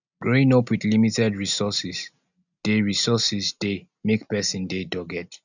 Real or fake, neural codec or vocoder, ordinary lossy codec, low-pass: real; none; none; 7.2 kHz